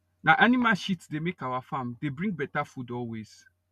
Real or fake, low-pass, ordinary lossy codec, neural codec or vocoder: real; 14.4 kHz; none; none